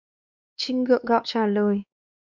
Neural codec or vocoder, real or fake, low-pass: codec, 16 kHz, 2 kbps, X-Codec, WavLM features, trained on Multilingual LibriSpeech; fake; 7.2 kHz